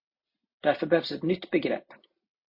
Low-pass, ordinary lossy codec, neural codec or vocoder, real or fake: 5.4 kHz; MP3, 32 kbps; none; real